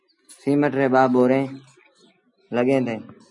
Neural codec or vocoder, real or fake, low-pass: none; real; 10.8 kHz